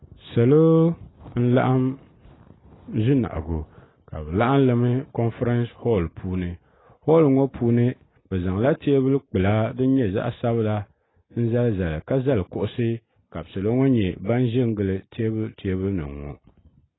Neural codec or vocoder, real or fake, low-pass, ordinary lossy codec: none; real; 7.2 kHz; AAC, 16 kbps